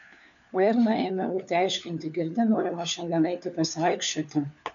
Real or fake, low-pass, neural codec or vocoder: fake; 7.2 kHz; codec, 16 kHz, 4 kbps, FunCodec, trained on LibriTTS, 50 frames a second